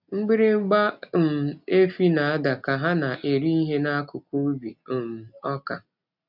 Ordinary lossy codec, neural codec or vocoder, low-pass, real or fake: none; none; 5.4 kHz; real